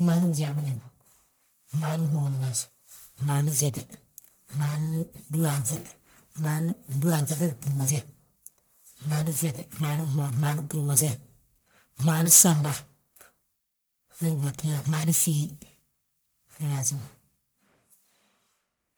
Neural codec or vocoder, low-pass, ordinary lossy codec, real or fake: codec, 44.1 kHz, 1.7 kbps, Pupu-Codec; none; none; fake